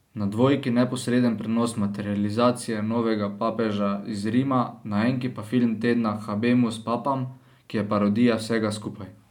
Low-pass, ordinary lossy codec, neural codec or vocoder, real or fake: 19.8 kHz; none; none; real